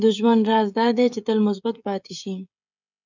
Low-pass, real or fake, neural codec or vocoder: 7.2 kHz; fake; codec, 16 kHz, 16 kbps, FreqCodec, smaller model